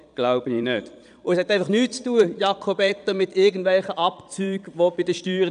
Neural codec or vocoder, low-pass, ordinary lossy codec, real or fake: vocoder, 22.05 kHz, 80 mel bands, Vocos; 9.9 kHz; none; fake